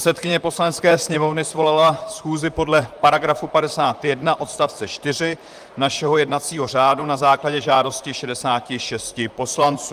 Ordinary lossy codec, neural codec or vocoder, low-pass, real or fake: Opus, 32 kbps; vocoder, 44.1 kHz, 128 mel bands, Pupu-Vocoder; 14.4 kHz; fake